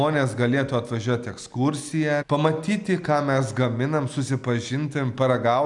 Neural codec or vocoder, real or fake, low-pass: none; real; 10.8 kHz